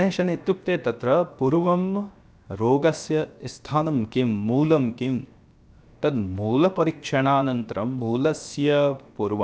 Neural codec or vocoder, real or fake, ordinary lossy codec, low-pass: codec, 16 kHz, 0.7 kbps, FocalCodec; fake; none; none